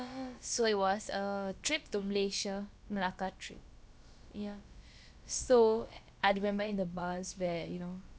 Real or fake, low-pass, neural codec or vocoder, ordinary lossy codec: fake; none; codec, 16 kHz, about 1 kbps, DyCAST, with the encoder's durations; none